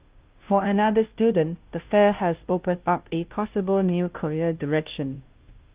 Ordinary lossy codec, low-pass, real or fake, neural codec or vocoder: Opus, 32 kbps; 3.6 kHz; fake; codec, 16 kHz, 0.5 kbps, FunCodec, trained on Chinese and English, 25 frames a second